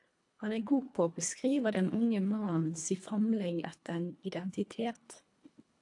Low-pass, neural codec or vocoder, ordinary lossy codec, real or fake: 10.8 kHz; codec, 24 kHz, 1.5 kbps, HILCodec; AAC, 64 kbps; fake